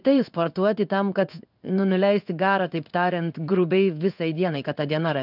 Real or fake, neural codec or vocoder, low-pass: fake; codec, 16 kHz in and 24 kHz out, 1 kbps, XY-Tokenizer; 5.4 kHz